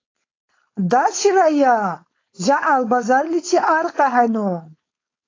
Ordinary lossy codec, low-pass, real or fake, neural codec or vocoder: AAC, 32 kbps; 7.2 kHz; fake; codec, 16 kHz, 4.8 kbps, FACodec